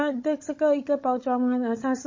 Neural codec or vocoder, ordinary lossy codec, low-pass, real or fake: codec, 16 kHz, 16 kbps, FunCodec, trained on Chinese and English, 50 frames a second; MP3, 32 kbps; 7.2 kHz; fake